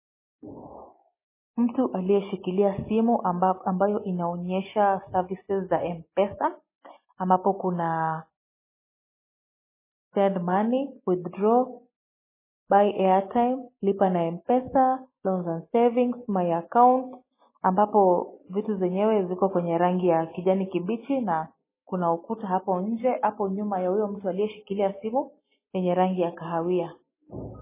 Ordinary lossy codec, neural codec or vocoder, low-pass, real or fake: MP3, 16 kbps; none; 3.6 kHz; real